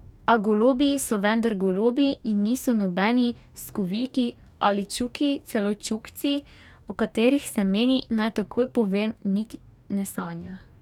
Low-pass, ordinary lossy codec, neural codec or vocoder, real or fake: 19.8 kHz; none; codec, 44.1 kHz, 2.6 kbps, DAC; fake